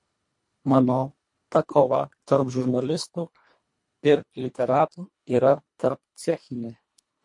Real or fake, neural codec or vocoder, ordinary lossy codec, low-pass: fake; codec, 24 kHz, 1.5 kbps, HILCodec; MP3, 48 kbps; 10.8 kHz